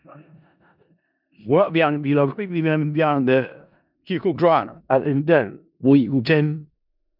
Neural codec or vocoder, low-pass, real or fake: codec, 16 kHz in and 24 kHz out, 0.4 kbps, LongCat-Audio-Codec, four codebook decoder; 5.4 kHz; fake